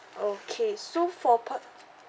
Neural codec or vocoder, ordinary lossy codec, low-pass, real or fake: none; none; none; real